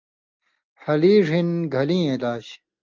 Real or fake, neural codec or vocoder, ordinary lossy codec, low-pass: real; none; Opus, 24 kbps; 7.2 kHz